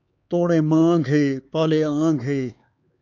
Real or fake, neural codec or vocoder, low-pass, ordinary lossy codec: fake; codec, 16 kHz, 4 kbps, X-Codec, HuBERT features, trained on LibriSpeech; 7.2 kHz; AAC, 48 kbps